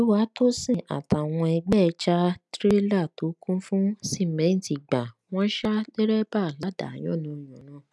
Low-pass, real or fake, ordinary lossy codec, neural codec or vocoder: none; real; none; none